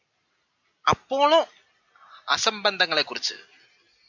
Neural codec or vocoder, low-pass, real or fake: none; 7.2 kHz; real